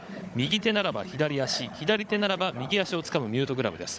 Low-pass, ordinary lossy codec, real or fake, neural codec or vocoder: none; none; fake; codec, 16 kHz, 16 kbps, FunCodec, trained on Chinese and English, 50 frames a second